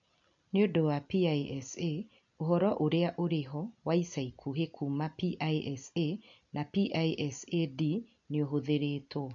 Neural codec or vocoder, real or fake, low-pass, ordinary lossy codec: none; real; 7.2 kHz; none